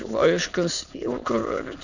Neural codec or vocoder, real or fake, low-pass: autoencoder, 22.05 kHz, a latent of 192 numbers a frame, VITS, trained on many speakers; fake; 7.2 kHz